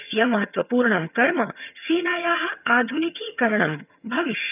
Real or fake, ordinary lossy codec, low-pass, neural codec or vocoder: fake; none; 3.6 kHz; vocoder, 22.05 kHz, 80 mel bands, HiFi-GAN